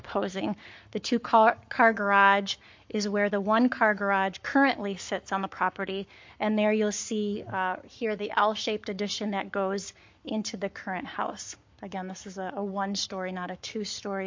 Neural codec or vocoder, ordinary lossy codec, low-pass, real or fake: codec, 44.1 kHz, 7.8 kbps, Pupu-Codec; MP3, 48 kbps; 7.2 kHz; fake